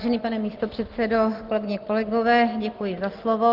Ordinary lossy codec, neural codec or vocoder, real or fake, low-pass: Opus, 16 kbps; none; real; 5.4 kHz